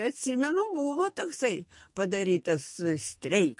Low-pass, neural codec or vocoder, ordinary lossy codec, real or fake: 10.8 kHz; codec, 44.1 kHz, 2.6 kbps, SNAC; MP3, 48 kbps; fake